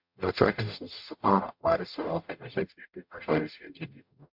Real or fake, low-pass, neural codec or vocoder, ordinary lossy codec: fake; 5.4 kHz; codec, 44.1 kHz, 0.9 kbps, DAC; MP3, 48 kbps